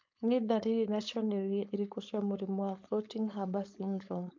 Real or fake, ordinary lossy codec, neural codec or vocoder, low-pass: fake; MP3, 64 kbps; codec, 16 kHz, 4.8 kbps, FACodec; 7.2 kHz